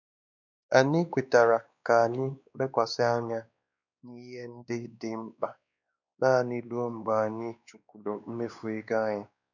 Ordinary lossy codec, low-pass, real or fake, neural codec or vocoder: none; 7.2 kHz; fake; codec, 16 kHz, 2 kbps, X-Codec, WavLM features, trained on Multilingual LibriSpeech